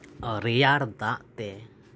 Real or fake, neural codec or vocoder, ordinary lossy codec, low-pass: real; none; none; none